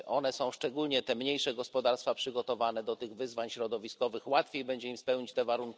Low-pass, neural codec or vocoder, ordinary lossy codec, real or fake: none; none; none; real